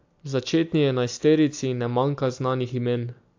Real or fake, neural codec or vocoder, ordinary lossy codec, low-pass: real; none; AAC, 48 kbps; 7.2 kHz